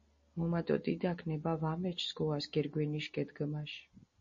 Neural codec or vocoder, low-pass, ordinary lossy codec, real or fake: none; 7.2 kHz; MP3, 32 kbps; real